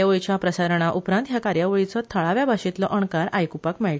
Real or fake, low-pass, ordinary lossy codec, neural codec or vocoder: real; none; none; none